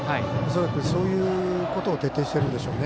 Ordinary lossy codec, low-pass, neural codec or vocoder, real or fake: none; none; none; real